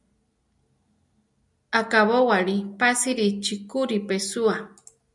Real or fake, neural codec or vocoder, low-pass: real; none; 10.8 kHz